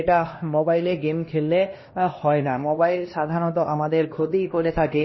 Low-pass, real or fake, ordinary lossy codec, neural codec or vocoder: 7.2 kHz; fake; MP3, 24 kbps; codec, 16 kHz, 1 kbps, X-Codec, HuBERT features, trained on LibriSpeech